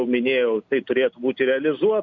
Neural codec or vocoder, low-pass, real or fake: none; 7.2 kHz; real